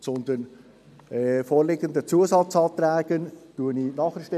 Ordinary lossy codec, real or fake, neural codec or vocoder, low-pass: MP3, 96 kbps; real; none; 14.4 kHz